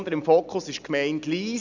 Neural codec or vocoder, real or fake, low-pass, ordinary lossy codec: none; real; 7.2 kHz; none